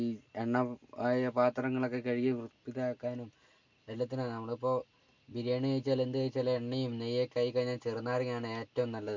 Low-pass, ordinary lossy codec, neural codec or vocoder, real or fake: 7.2 kHz; MP3, 48 kbps; none; real